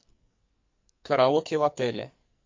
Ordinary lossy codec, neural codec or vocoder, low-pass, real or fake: MP3, 48 kbps; codec, 44.1 kHz, 2.6 kbps, SNAC; 7.2 kHz; fake